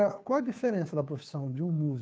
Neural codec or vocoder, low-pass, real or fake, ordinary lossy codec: codec, 16 kHz, 2 kbps, FunCodec, trained on Chinese and English, 25 frames a second; none; fake; none